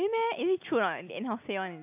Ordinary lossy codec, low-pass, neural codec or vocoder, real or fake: AAC, 32 kbps; 3.6 kHz; none; real